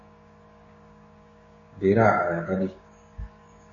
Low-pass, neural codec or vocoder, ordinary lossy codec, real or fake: 7.2 kHz; codec, 16 kHz, 6 kbps, DAC; MP3, 32 kbps; fake